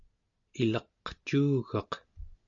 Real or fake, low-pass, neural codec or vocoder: real; 7.2 kHz; none